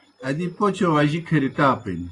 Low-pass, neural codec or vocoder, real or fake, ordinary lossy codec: 10.8 kHz; none; real; AAC, 32 kbps